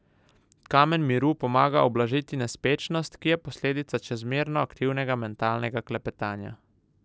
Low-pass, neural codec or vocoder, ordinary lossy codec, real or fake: none; none; none; real